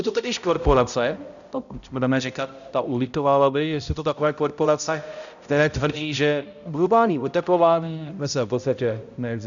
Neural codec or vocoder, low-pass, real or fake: codec, 16 kHz, 0.5 kbps, X-Codec, HuBERT features, trained on balanced general audio; 7.2 kHz; fake